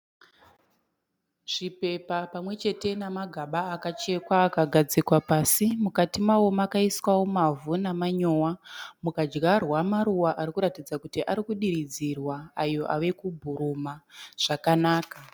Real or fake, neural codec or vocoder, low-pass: real; none; 19.8 kHz